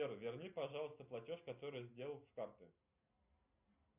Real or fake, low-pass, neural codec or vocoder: real; 3.6 kHz; none